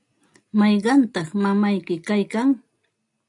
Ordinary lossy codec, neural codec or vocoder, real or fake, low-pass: AAC, 64 kbps; none; real; 10.8 kHz